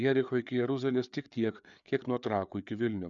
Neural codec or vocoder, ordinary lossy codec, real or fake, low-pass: codec, 16 kHz, 4 kbps, FreqCodec, larger model; MP3, 96 kbps; fake; 7.2 kHz